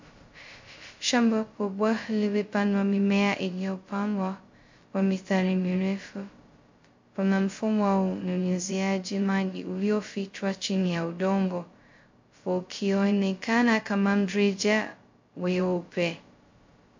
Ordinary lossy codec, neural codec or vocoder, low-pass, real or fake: MP3, 48 kbps; codec, 16 kHz, 0.2 kbps, FocalCodec; 7.2 kHz; fake